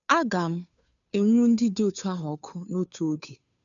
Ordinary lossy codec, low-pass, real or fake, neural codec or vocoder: none; 7.2 kHz; fake; codec, 16 kHz, 2 kbps, FunCodec, trained on Chinese and English, 25 frames a second